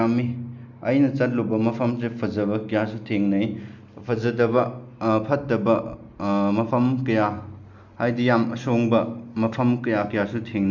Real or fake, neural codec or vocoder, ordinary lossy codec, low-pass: real; none; none; 7.2 kHz